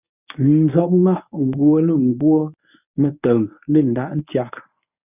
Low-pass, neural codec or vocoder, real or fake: 3.6 kHz; codec, 24 kHz, 0.9 kbps, WavTokenizer, medium speech release version 1; fake